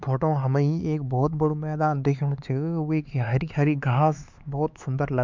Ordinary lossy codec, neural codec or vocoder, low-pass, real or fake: MP3, 64 kbps; codec, 16 kHz, 4 kbps, X-Codec, HuBERT features, trained on LibriSpeech; 7.2 kHz; fake